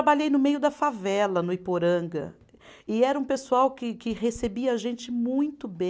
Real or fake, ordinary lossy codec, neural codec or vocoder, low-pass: real; none; none; none